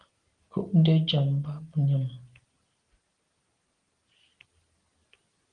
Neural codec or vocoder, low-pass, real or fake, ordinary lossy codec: none; 9.9 kHz; real; Opus, 24 kbps